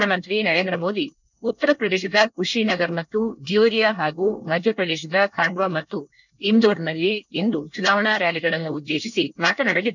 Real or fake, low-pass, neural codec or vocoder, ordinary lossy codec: fake; 7.2 kHz; codec, 24 kHz, 1 kbps, SNAC; none